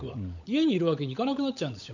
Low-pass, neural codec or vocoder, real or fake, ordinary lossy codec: 7.2 kHz; codec, 16 kHz, 16 kbps, FunCodec, trained on LibriTTS, 50 frames a second; fake; none